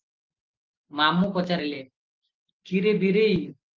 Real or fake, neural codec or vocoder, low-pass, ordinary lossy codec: real; none; 7.2 kHz; Opus, 24 kbps